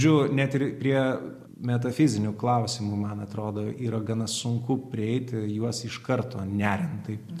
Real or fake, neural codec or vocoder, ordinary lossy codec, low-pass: real; none; MP3, 64 kbps; 14.4 kHz